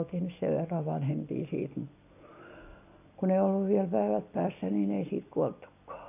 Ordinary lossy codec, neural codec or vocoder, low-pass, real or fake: AAC, 32 kbps; none; 3.6 kHz; real